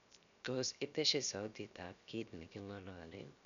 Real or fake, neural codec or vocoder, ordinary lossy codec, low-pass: fake; codec, 16 kHz, 0.7 kbps, FocalCodec; none; 7.2 kHz